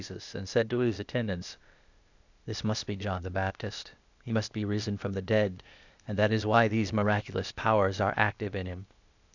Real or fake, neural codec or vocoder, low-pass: fake; codec, 16 kHz, 0.8 kbps, ZipCodec; 7.2 kHz